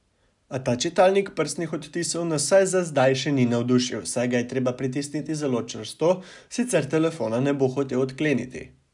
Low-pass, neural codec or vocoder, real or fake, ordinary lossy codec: 10.8 kHz; none; real; none